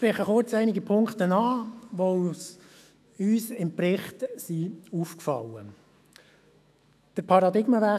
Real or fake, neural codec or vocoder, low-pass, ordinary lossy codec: fake; autoencoder, 48 kHz, 128 numbers a frame, DAC-VAE, trained on Japanese speech; 14.4 kHz; none